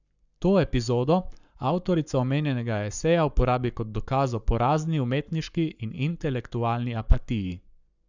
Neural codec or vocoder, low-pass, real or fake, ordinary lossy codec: codec, 44.1 kHz, 7.8 kbps, Pupu-Codec; 7.2 kHz; fake; none